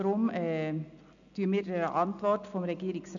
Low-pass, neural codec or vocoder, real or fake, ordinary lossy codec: 7.2 kHz; none; real; none